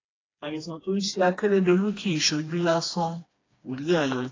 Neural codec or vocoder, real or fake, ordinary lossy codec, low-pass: codec, 16 kHz, 2 kbps, FreqCodec, smaller model; fake; AAC, 32 kbps; 7.2 kHz